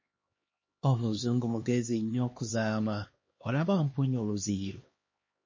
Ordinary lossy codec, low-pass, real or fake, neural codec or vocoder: MP3, 32 kbps; 7.2 kHz; fake; codec, 16 kHz, 2 kbps, X-Codec, HuBERT features, trained on LibriSpeech